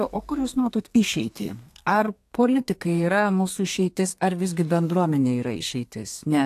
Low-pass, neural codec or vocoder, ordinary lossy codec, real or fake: 14.4 kHz; codec, 32 kHz, 1.9 kbps, SNAC; AAC, 64 kbps; fake